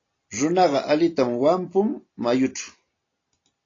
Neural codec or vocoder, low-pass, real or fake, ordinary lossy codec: none; 7.2 kHz; real; AAC, 32 kbps